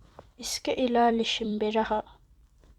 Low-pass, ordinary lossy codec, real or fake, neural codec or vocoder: 19.8 kHz; Opus, 64 kbps; fake; vocoder, 44.1 kHz, 128 mel bands every 256 samples, BigVGAN v2